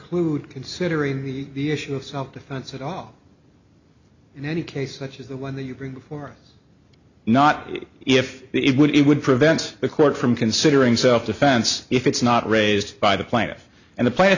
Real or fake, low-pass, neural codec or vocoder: real; 7.2 kHz; none